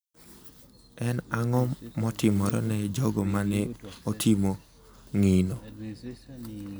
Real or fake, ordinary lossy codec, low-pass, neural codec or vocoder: real; none; none; none